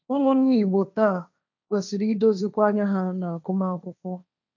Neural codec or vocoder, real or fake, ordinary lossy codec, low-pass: codec, 16 kHz, 1.1 kbps, Voila-Tokenizer; fake; none; 7.2 kHz